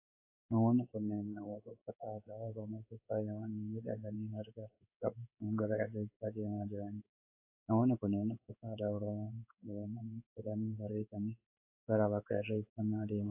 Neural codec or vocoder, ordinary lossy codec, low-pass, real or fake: codec, 16 kHz in and 24 kHz out, 1 kbps, XY-Tokenizer; AAC, 24 kbps; 3.6 kHz; fake